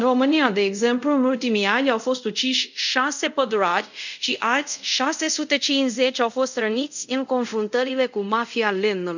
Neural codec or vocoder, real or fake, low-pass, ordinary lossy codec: codec, 24 kHz, 0.5 kbps, DualCodec; fake; 7.2 kHz; none